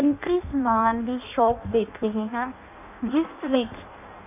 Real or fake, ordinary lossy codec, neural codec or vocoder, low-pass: fake; none; codec, 16 kHz in and 24 kHz out, 0.6 kbps, FireRedTTS-2 codec; 3.6 kHz